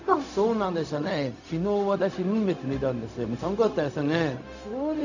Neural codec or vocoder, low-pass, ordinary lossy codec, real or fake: codec, 16 kHz, 0.4 kbps, LongCat-Audio-Codec; 7.2 kHz; none; fake